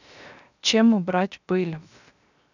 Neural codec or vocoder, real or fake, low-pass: codec, 16 kHz, 0.3 kbps, FocalCodec; fake; 7.2 kHz